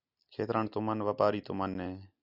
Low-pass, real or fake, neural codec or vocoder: 5.4 kHz; real; none